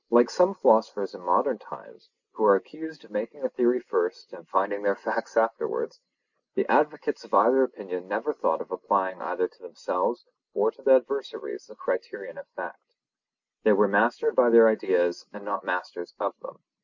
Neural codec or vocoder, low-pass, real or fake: none; 7.2 kHz; real